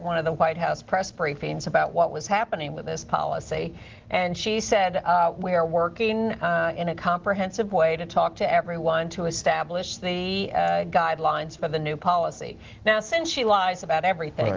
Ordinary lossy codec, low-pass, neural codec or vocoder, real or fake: Opus, 16 kbps; 7.2 kHz; none; real